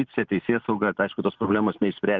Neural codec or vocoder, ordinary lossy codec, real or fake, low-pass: none; Opus, 32 kbps; real; 7.2 kHz